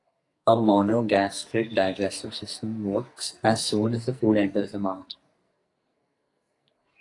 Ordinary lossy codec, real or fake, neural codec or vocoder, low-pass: AAC, 48 kbps; fake; codec, 44.1 kHz, 2.6 kbps, SNAC; 10.8 kHz